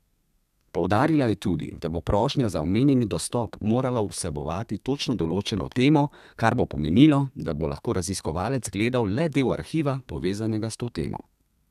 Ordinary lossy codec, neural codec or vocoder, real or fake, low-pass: none; codec, 32 kHz, 1.9 kbps, SNAC; fake; 14.4 kHz